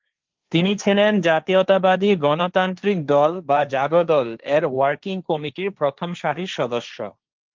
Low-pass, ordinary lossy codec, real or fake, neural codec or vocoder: 7.2 kHz; Opus, 24 kbps; fake; codec, 16 kHz, 1.1 kbps, Voila-Tokenizer